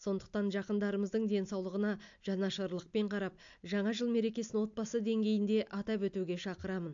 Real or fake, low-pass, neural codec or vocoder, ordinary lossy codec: real; 7.2 kHz; none; AAC, 64 kbps